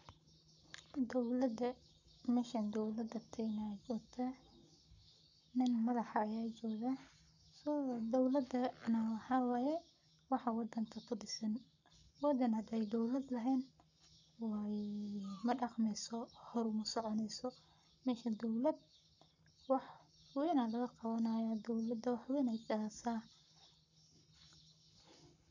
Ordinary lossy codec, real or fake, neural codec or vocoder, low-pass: none; fake; codec, 44.1 kHz, 7.8 kbps, Pupu-Codec; 7.2 kHz